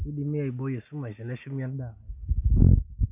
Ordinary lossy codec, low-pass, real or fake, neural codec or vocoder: none; 3.6 kHz; real; none